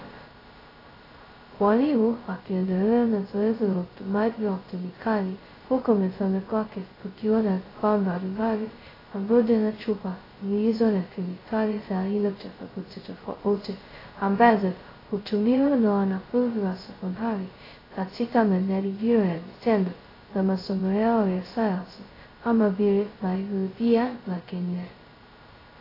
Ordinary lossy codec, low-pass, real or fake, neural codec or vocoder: AAC, 24 kbps; 5.4 kHz; fake; codec, 16 kHz, 0.2 kbps, FocalCodec